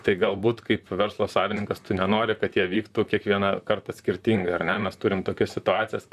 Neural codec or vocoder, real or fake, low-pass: vocoder, 44.1 kHz, 128 mel bands, Pupu-Vocoder; fake; 14.4 kHz